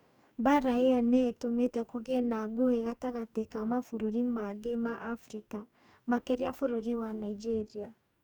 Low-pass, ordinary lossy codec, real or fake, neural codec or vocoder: 19.8 kHz; none; fake; codec, 44.1 kHz, 2.6 kbps, DAC